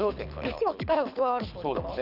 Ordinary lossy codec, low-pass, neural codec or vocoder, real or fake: none; 5.4 kHz; codec, 24 kHz, 6 kbps, HILCodec; fake